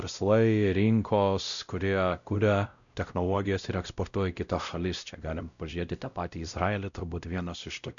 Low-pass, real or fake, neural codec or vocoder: 7.2 kHz; fake; codec, 16 kHz, 0.5 kbps, X-Codec, WavLM features, trained on Multilingual LibriSpeech